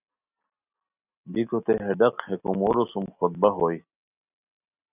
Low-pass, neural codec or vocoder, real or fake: 3.6 kHz; none; real